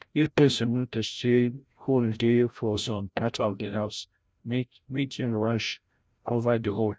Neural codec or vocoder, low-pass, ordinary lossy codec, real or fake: codec, 16 kHz, 0.5 kbps, FreqCodec, larger model; none; none; fake